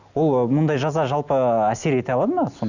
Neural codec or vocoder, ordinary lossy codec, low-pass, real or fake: none; none; 7.2 kHz; real